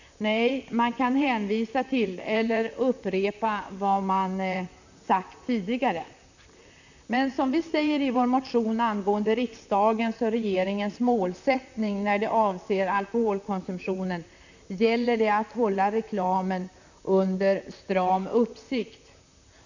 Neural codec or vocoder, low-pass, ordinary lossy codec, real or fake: vocoder, 44.1 kHz, 128 mel bands, Pupu-Vocoder; 7.2 kHz; none; fake